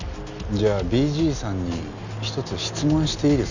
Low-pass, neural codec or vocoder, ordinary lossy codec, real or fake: 7.2 kHz; none; none; real